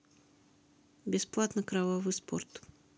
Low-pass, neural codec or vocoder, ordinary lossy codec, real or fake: none; none; none; real